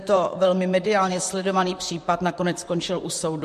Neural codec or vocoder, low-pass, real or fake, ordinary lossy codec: vocoder, 44.1 kHz, 128 mel bands, Pupu-Vocoder; 14.4 kHz; fake; MP3, 64 kbps